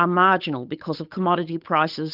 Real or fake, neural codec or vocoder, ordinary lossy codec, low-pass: fake; codec, 16 kHz, 8 kbps, FunCodec, trained on Chinese and English, 25 frames a second; Opus, 32 kbps; 5.4 kHz